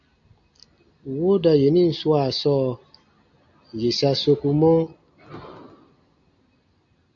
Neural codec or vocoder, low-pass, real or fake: none; 7.2 kHz; real